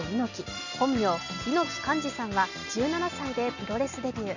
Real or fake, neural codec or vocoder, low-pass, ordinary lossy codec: real; none; 7.2 kHz; none